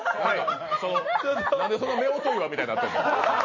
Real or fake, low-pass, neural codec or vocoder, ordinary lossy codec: real; 7.2 kHz; none; MP3, 32 kbps